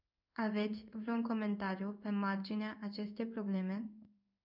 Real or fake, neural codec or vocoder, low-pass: fake; codec, 16 kHz in and 24 kHz out, 1 kbps, XY-Tokenizer; 5.4 kHz